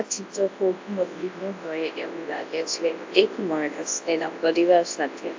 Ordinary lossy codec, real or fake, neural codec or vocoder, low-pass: AAC, 48 kbps; fake; codec, 24 kHz, 0.9 kbps, WavTokenizer, large speech release; 7.2 kHz